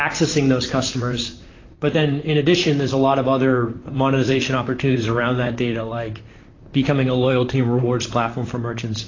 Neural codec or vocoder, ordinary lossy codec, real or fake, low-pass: vocoder, 44.1 kHz, 128 mel bands, Pupu-Vocoder; AAC, 32 kbps; fake; 7.2 kHz